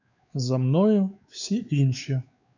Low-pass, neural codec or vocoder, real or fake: 7.2 kHz; codec, 16 kHz, 4 kbps, X-Codec, WavLM features, trained on Multilingual LibriSpeech; fake